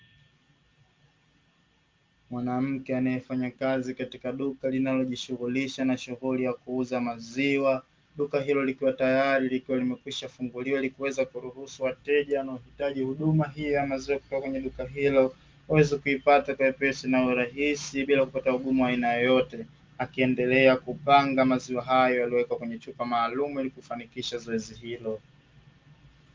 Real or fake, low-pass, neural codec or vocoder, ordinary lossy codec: real; 7.2 kHz; none; Opus, 32 kbps